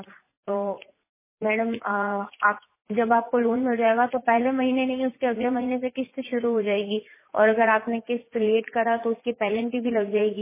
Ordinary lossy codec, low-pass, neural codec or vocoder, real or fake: MP3, 16 kbps; 3.6 kHz; vocoder, 44.1 kHz, 80 mel bands, Vocos; fake